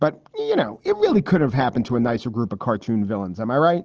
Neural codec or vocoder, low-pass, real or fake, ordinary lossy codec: none; 7.2 kHz; real; Opus, 16 kbps